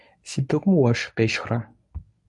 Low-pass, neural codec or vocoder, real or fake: 10.8 kHz; codec, 24 kHz, 0.9 kbps, WavTokenizer, medium speech release version 1; fake